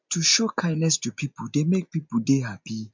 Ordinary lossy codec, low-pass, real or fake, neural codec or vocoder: MP3, 64 kbps; 7.2 kHz; real; none